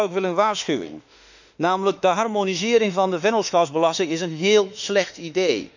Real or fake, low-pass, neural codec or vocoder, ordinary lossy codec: fake; 7.2 kHz; autoencoder, 48 kHz, 32 numbers a frame, DAC-VAE, trained on Japanese speech; none